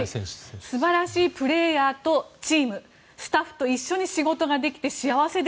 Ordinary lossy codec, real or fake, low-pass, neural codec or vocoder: none; real; none; none